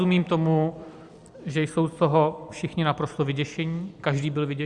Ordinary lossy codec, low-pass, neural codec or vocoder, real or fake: Opus, 64 kbps; 10.8 kHz; vocoder, 44.1 kHz, 128 mel bands every 256 samples, BigVGAN v2; fake